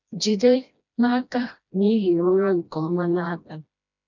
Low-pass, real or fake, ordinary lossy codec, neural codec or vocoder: 7.2 kHz; fake; none; codec, 16 kHz, 1 kbps, FreqCodec, smaller model